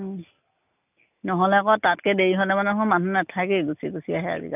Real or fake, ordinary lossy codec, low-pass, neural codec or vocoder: real; none; 3.6 kHz; none